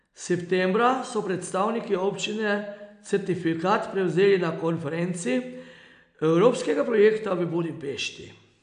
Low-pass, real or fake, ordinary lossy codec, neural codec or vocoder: 9.9 kHz; real; none; none